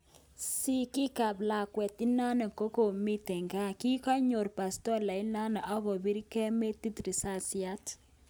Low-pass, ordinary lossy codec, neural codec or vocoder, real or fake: none; none; none; real